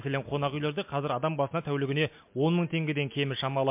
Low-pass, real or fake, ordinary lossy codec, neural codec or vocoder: 3.6 kHz; real; MP3, 32 kbps; none